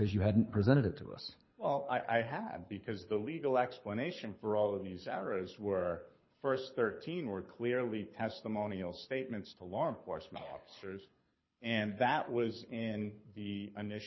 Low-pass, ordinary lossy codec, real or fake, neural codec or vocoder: 7.2 kHz; MP3, 24 kbps; fake; codec, 24 kHz, 6 kbps, HILCodec